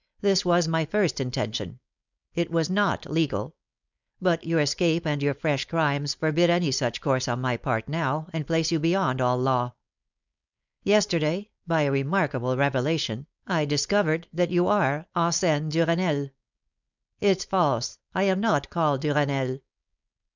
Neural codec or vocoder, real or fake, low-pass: codec, 16 kHz, 4.8 kbps, FACodec; fake; 7.2 kHz